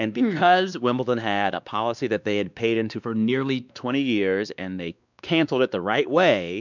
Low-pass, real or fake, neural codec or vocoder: 7.2 kHz; fake; codec, 16 kHz, 2 kbps, X-Codec, WavLM features, trained on Multilingual LibriSpeech